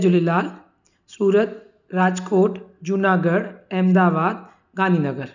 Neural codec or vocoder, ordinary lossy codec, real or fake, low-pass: none; none; real; 7.2 kHz